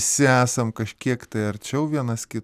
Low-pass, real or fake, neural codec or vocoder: 14.4 kHz; real; none